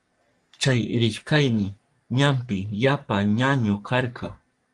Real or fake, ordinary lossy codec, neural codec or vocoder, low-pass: fake; Opus, 32 kbps; codec, 44.1 kHz, 3.4 kbps, Pupu-Codec; 10.8 kHz